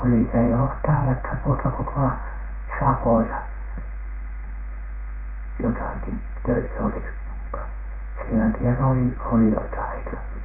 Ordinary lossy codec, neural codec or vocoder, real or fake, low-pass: none; codec, 16 kHz in and 24 kHz out, 1 kbps, XY-Tokenizer; fake; 3.6 kHz